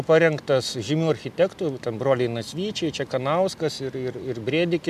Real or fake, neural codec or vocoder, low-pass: real; none; 14.4 kHz